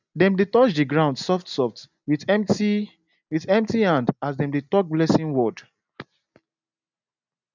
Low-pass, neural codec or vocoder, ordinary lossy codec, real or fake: 7.2 kHz; none; none; real